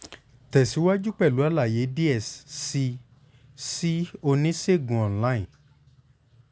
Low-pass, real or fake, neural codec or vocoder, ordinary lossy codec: none; real; none; none